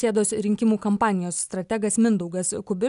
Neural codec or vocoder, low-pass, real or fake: none; 10.8 kHz; real